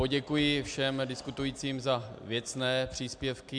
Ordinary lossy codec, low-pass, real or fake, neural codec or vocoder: MP3, 96 kbps; 9.9 kHz; real; none